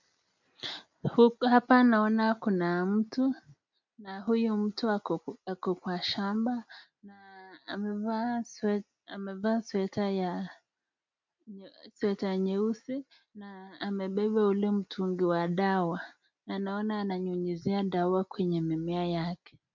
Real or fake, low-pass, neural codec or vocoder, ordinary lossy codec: real; 7.2 kHz; none; MP3, 64 kbps